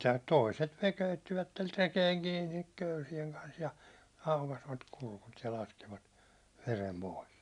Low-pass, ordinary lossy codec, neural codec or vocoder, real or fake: 10.8 kHz; none; none; real